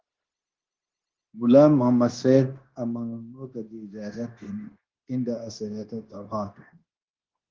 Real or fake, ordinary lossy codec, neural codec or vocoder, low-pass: fake; Opus, 16 kbps; codec, 16 kHz, 0.9 kbps, LongCat-Audio-Codec; 7.2 kHz